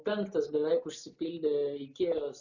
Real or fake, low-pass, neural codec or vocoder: real; 7.2 kHz; none